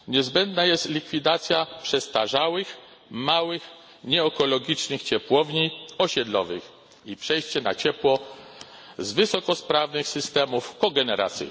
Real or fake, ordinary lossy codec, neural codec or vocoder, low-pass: real; none; none; none